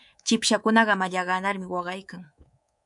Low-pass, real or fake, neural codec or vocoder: 10.8 kHz; fake; codec, 24 kHz, 3.1 kbps, DualCodec